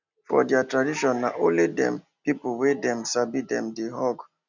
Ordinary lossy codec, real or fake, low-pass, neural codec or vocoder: none; real; 7.2 kHz; none